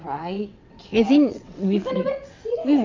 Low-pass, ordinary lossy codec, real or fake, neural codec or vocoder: 7.2 kHz; MP3, 64 kbps; fake; vocoder, 22.05 kHz, 80 mel bands, WaveNeXt